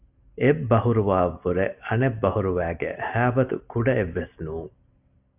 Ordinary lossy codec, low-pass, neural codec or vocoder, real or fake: AAC, 32 kbps; 3.6 kHz; none; real